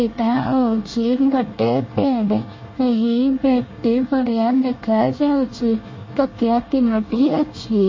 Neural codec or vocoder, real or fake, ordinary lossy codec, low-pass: codec, 24 kHz, 1 kbps, SNAC; fake; MP3, 32 kbps; 7.2 kHz